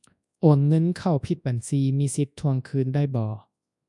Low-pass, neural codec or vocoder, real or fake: 10.8 kHz; codec, 24 kHz, 0.9 kbps, WavTokenizer, large speech release; fake